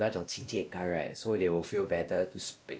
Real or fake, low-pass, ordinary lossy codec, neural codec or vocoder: fake; none; none; codec, 16 kHz, 1 kbps, X-Codec, WavLM features, trained on Multilingual LibriSpeech